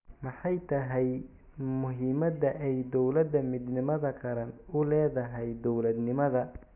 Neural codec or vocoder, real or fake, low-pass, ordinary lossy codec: none; real; 3.6 kHz; none